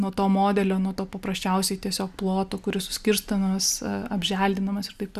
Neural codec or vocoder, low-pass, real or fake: none; 14.4 kHz; real